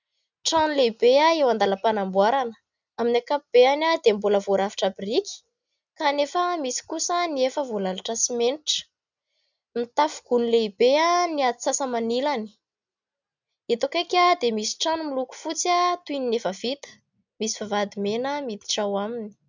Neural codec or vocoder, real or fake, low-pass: none; real; 7.2 kHz